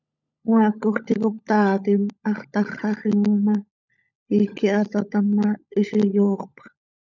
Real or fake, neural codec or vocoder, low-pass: fake; codec, 16 kHz, 16 kbps, FunCodec, trained on LibriTTS, 50 frames a second; 7.2 kHz